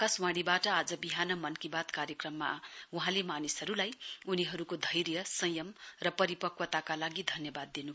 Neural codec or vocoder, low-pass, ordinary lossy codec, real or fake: none; none; none; real